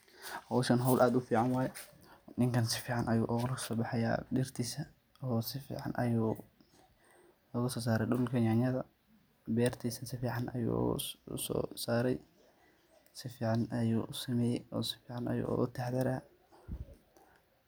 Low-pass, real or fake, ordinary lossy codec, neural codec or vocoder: none; real; none; none